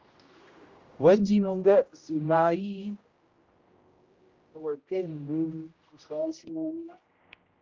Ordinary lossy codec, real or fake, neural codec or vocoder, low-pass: Opus, 32 kbps; fake; codec, 16 kHz, 0.5 kbps, X-Codec, HuBERT features, trained on general audio; 7.2 kHz